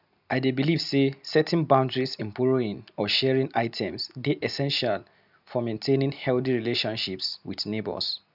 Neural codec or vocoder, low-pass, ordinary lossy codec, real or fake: none; 5.4 kHz; none; real